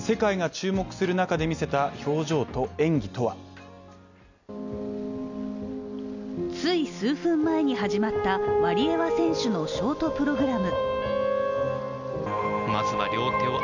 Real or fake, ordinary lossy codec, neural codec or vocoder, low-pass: real; none; none; 7.2 kHz